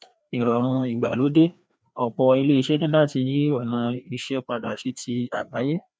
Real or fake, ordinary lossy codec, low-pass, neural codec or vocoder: fake; none; none; codec, 16 kHz, 2 kbps, FreqCodec, larger model